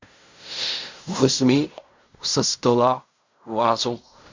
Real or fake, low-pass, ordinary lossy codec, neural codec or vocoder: fake; 7.2 kHz; MP3, 64 kbps; codec, 16 kHz in and 24 kHz out, 0.4 kbps, LongCat-Audio-Codec, fine tuned four codebook decoder